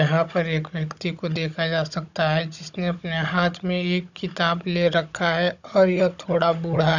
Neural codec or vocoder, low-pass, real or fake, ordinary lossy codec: codec, 16 kHz, 16 kbps, FunCodec, trained on Chinese and English, 50 frames a second; 7.2 kHz; fake; none